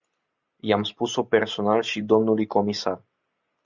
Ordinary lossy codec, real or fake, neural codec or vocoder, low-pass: Opus, 64 kbps; real; none; 7.2 kHz